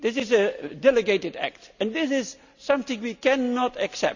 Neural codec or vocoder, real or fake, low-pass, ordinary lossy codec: none; real; 7.2 kHz; Opus, 64 kbps